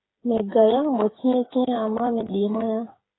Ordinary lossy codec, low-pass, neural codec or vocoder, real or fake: AAC, 16 kbps; 7.2 kHz; codec, 16 kHz, 8 kbps, FreqCodec, smaller model; fake